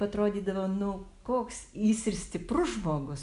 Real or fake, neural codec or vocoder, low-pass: real; none; 10.8 kHz